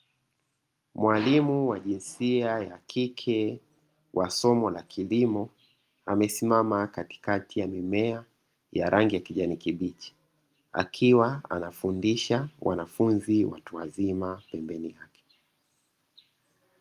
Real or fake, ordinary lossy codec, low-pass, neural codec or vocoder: real; Opus, 32 kbps; 14.4 kHz; none